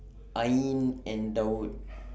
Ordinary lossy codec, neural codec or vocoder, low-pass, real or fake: none; none; none; real